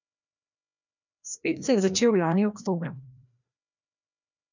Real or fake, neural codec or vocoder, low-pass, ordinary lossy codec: fake; codec, 16 kHz, 1 kbps, FreqCodec, larger model; 7.2 kHz; none